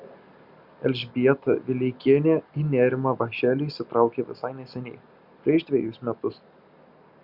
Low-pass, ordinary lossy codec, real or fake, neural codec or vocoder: 5.4 kHz; Opus, 64 kbps; real; none